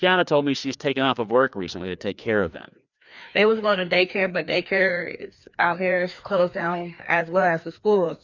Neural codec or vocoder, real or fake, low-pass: codec, 16 kHz, 2 kbps, FreqCodec, larger model; fake; 7.2 kHz